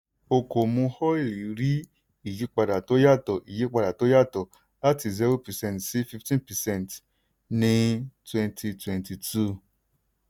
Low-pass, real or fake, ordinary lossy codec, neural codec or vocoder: none; real; none; none